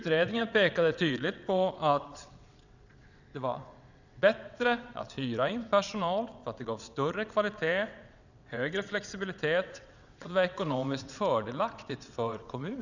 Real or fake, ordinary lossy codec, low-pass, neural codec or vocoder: fake; none; 7.2 kHz; vocoder, 22.05 kHz, 80 mel bands, WaveNeXt